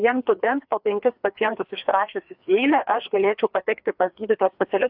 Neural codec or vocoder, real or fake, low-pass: codec, 16 kHz, 2 kbps, FreqCodec, larger model; fake; 5.4 kHz